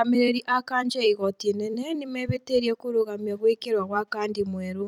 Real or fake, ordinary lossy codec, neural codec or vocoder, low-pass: fake; none; vocoder, 44.1 kHz, 128 mel bands every 256 samples, BigVGAN v2; 19.8 kHz